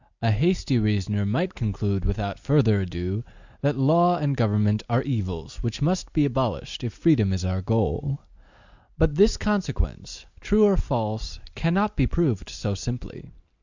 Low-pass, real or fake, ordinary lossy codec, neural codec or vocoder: 7.2 kHz; real; Opus, 64 kbps; none